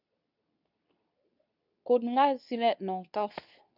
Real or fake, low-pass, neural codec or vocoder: fake; 5.4 kHz; codec, 24 kHz, 0.9 kbps, WavTokenizer, medium speech release version 2